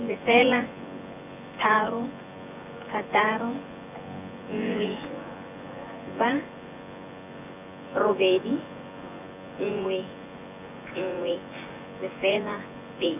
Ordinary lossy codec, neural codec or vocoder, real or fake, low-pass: none; vocoder, 24 kHz, 100 mel bands, Vocos; fake; 3.6 kHz